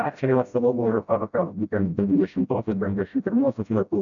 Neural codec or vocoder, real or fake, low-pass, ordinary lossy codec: codec, 16 kHz, 0.5 kbps, FreqCodec, smaller model; fake; 7.2 kHz; MP3, 96 kbps